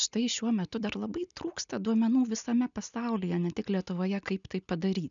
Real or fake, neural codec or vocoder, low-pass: real; none; 7.2 kHz